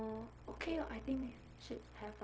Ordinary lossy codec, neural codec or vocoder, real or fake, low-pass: none; codec, 16 kHz, 0.4 kbps, LongCat-Audio-Codec; fake; none